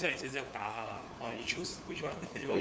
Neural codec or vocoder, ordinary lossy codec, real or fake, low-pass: codec, 16 kHz, 4 kbps, FunCodec, trained on LibriTTS, 50 frames a second; none; fake; none